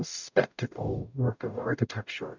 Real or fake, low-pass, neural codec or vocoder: fake; 7.2 kHz; codec, 44.1 kHz, 0.9 kbps, DAC